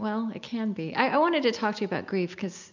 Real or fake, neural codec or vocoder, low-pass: real; none; 7.2 kHz